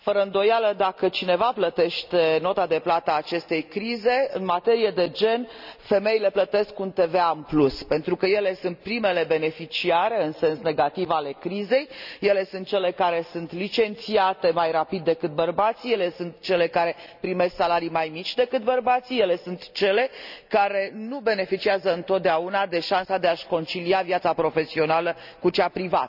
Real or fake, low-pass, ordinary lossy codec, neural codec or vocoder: real; 5.4 kHz; none; none